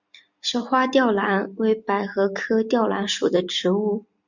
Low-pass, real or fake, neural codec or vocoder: 7.2 kHz; real; none